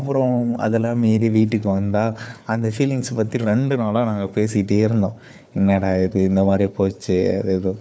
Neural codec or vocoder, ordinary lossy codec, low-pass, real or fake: codec, 16 kHz, 4 kbps, FunCodec, trained on Chinese and English, 50 frames a second; none; none; fake